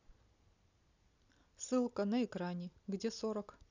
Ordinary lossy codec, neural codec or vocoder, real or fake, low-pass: none; none; real; 7.2 kHz